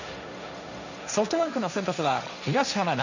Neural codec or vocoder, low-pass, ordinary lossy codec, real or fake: codec, 16 kHz, 1.1 kbps, Voila-Tokenizer; 7.2 kHz; none; fake